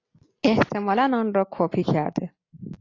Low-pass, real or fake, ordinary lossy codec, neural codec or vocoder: 7.2 kHz; real; AAC, 32 kbps; none